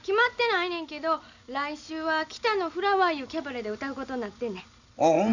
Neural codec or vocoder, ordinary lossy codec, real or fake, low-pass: none; none; real; 7.2 kHz